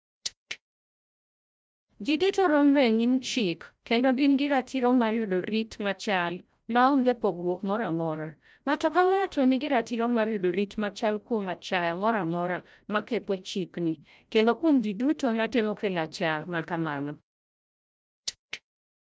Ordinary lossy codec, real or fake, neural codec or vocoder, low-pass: none; fake; codec, 16 kHz, 0.5 kbps, FreqCodec, larger model; none